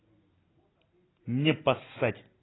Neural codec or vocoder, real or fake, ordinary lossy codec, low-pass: none; real; AAC, 16 kbps; 7.2 kHz